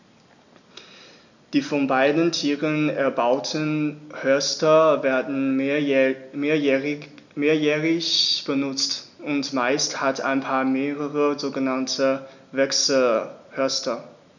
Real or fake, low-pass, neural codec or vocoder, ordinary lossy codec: real; 7.2 kHz; none; none